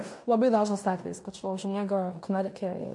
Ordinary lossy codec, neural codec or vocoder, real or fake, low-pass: MP3, 48 kbps; codec, 16 kHz in and 24 kHz out, 0.9 kbps, LongCat-Audio-Codec, fine tuned four codebook decoder; fake; 10.8 kHz